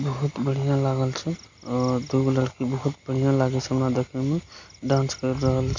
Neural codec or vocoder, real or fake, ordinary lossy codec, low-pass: none; real; MP3, 48 kbps; 7.2 kHz